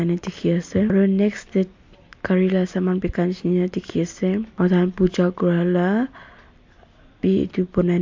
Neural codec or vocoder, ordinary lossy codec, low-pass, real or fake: none; MP3, 48 kbps; 7.2 kHz; real